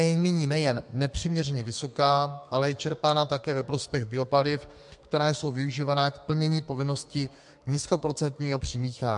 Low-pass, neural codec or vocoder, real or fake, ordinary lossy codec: 10.8 kHz; codec, 32 kHz, 1.9 kbps, SNAC; fake; MP3, 64 kbps